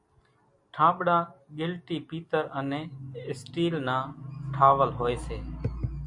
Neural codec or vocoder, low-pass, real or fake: none; 10.8 kHz; real